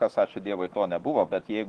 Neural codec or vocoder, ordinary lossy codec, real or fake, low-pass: autoencoder, 48 kHz, 128 numbers a frame, DAC-VAE, trained on Japanese speech; Opus, 16 kbps; fake; 10.8 kHz